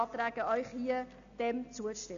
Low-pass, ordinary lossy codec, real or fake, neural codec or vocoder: 7.2 kHz; AAC, 64 kbps; real; none